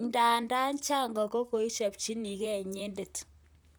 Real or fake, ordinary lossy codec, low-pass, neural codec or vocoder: fake; none; none; vocoder, 44.1 kHz, 128 mel bands, Pupu-Vocoder